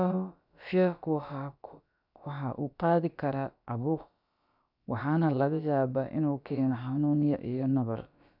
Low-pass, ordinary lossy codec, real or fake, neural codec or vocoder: 5.4 kHz; none; fake; codec, 16 kHz, about 1 kbps, DyCAST, with the encoder's durations